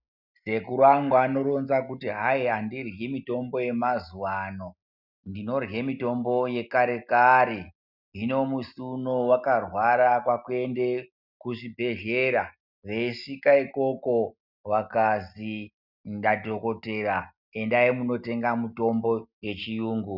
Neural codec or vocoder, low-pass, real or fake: none; 5.4 kHz; real